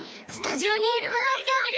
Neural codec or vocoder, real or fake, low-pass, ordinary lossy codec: codec, 16 kHz, 1 kbps, FreqCodec, larger model; fake; none; none